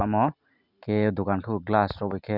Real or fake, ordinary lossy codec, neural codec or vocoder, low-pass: real; none; none; 5.4 kHz